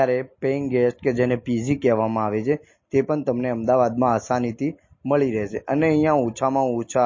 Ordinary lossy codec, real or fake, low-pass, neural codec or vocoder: MP3, 32 kbps; real; 7.2 kHz; none